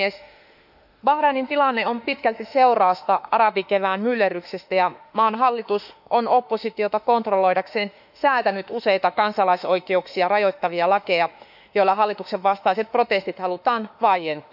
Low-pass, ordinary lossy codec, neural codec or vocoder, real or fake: 5.4 kHz; none; autoencoder, 48 kHz, 32 numbers a frame, DAC-VAE, trained on Japanese speech; fake